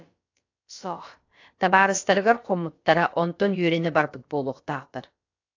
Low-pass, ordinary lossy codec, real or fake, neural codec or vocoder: 7.2 kHz; AAC, 48 kbps; fake; codec, 16 kHz, about 1 kbps, DyCAST, with the encoder's durations